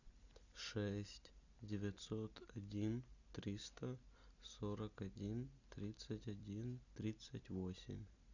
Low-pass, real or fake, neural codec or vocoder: 7.2 kHz; real; none